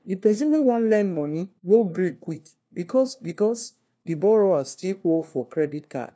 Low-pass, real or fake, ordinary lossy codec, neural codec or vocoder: none; fake; none; codec, 16 kHz, 1 kbps, FunCodec, trained on LibriTTS, 50 frames a second